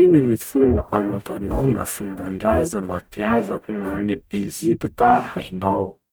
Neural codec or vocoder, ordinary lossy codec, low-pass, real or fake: codec, 44.1 kHz, 0.9 kbps, DAC; none; none; fake